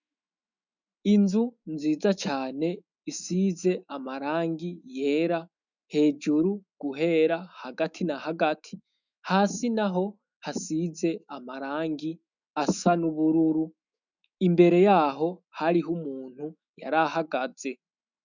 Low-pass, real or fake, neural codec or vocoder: 7.2 kHz; fake; autoencoder, 48 kHz, 128 numbers a frame, DAC-VAE, trained on Japanese speech